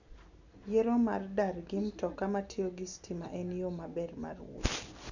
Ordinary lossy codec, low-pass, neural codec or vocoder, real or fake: none; 7.2 kHz; none; real